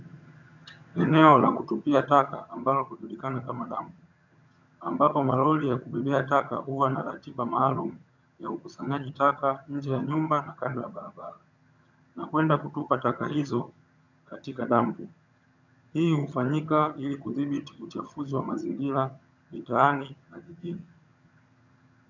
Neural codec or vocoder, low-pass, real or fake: vocoder, 22.05 kHz, 80 mel bands, HiFi-GAN; 7.2 kHz; fake